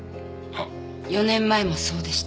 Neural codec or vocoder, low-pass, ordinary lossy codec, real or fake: none; none; none; real